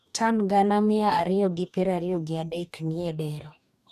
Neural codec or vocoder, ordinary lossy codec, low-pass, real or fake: codec, 44.1 kHz, 2.6 kbps, DAC; none; 14.4 kHz; fake